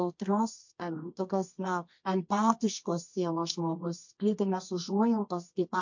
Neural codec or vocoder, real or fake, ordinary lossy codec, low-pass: codec, 24 kHz, 0.9 kbps, WavTokenizer, medium music audio release; fake; MP3, 48 kbps; 7.2 kHz